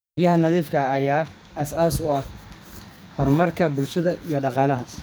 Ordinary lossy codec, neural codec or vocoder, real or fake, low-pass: none; codec, 44.1 kHz, 2.6 kbps, SNAC; fake; none